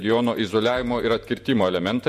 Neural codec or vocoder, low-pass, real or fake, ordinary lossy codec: vocoder, 48 kHz, 128 mel bands, Vocos; 14.4 kHz; fake; MP3, 64 kbps